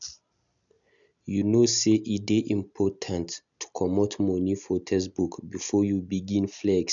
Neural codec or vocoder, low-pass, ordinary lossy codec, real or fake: none; 7.2 kHz; none; real